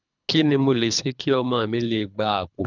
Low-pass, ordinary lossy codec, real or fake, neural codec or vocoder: 7.2 kHz; none; fake; codec, 24 kHz, 3 kbps, HILCodec